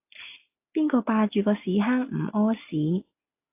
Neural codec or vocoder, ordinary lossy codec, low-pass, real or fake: none; AAC, 32 kbps; 3.6 kHz; real